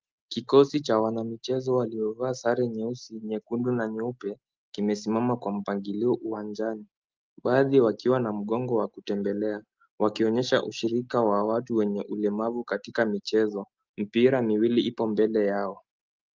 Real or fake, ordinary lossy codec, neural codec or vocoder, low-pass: real; Opus, 32 kbps; none; 7.2 kHz